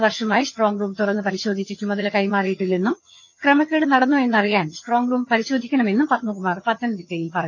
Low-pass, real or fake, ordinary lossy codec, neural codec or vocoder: 7.2 kHz; fake; none; vocoder, 22.05 kHz, 80 mel bands, HiFi-GAN